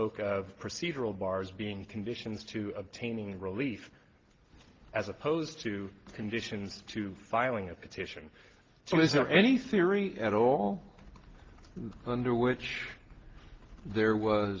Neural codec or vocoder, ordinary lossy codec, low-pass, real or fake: none; Opus, 16 kbps; 7.2 kHz; real